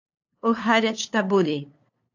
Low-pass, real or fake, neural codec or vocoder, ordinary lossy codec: 7.2 kHz; fake; codec, 16 kHz, 2 kbps, FunCodec, trained on LibriTTS, 25 frames a second; AAC, 48 kbps